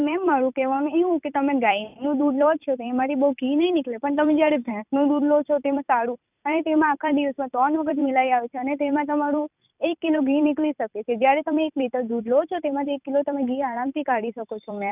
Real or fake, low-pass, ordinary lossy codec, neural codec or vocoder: fake; 3.6 kHz; none; vocoder, 44.1 kHz, 128 mel bands every 256 samples, BigVGAN v2